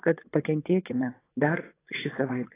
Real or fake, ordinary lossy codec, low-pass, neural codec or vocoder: fake; AAC, 16 kbps; 3.6 kHz; vocoder, 22.05 kHz, 80 mel bands, WaveNeXt